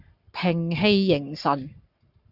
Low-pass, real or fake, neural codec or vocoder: 5.4 kHz; fake; codec, 44.1 kHz, 7.8 kbps, Pupu-Codec